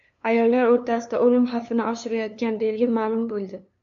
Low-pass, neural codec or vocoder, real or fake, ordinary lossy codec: 7.2 kHz; codec, 16 kHz, 2 kbps, FunCodec, trained on LibriTTS, 25 frames a second; fake; AAC, 64 kbps